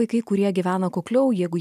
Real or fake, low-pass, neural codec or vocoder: real; 14.4 kHz; none